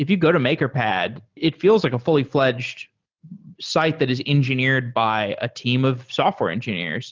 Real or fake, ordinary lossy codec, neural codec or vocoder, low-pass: real; Opus, 24 kbps; none; 7.2 kHz